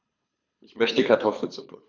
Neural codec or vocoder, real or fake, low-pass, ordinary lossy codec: codec, 24 kHz, 3 kbps, HILCodec; fake; 7.2 kHz; none